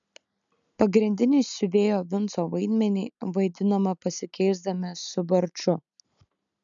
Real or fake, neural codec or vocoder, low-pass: real; none; 7.2 kHz